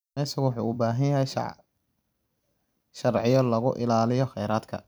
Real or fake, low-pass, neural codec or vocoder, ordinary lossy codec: fake; none; vocoder, 44.1 kHz, 128 mel bands every 256 samples, BigVGAN v2; none